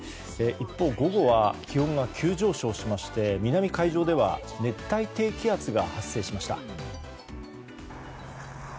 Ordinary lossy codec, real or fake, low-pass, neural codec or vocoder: none; real; none; none